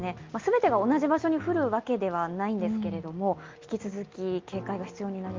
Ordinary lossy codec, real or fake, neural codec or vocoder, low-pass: Opus, 24 kbps; real; none; 7.2 kHz